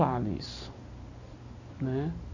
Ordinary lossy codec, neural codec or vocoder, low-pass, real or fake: none; none; 7.2 kHz; real